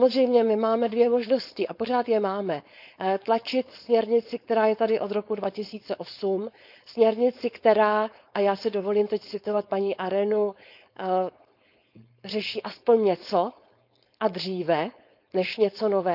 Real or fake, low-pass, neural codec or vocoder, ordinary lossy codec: fake; 5.4 kHz; codec, 16 kHz, 4.8 kbps, FACodec; none